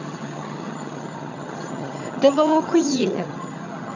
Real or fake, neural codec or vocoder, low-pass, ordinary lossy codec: fake; vocoder, 22.05 kHz, 80 mel bands, HiFi-GAN; 7.2 kHz; none